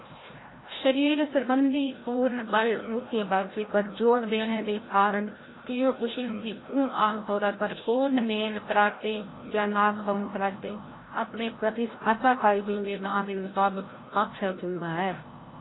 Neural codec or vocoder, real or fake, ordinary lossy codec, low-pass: codec, 16 kHz, 0.5 kbps, FreqCodec, larger model; fake; AAC, 16 kbps; 7.2 kHz